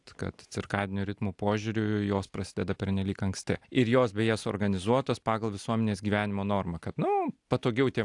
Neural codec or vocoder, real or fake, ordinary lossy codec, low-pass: none; real; AAC, 64 kbps; 10.8 kHz